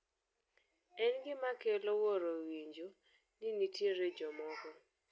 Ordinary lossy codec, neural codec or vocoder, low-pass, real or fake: none; none; none; real